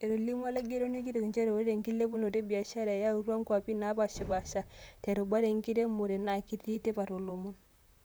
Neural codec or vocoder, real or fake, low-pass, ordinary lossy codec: vocoder, 44.1 kHz, 128 mel bands, Pupu-Vocoder; fake; none; none